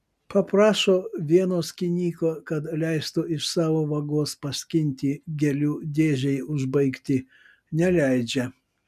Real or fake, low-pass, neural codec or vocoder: real; 14.4 kHz; none